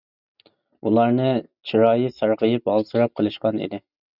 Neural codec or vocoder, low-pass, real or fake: none; 5.4 kHz; real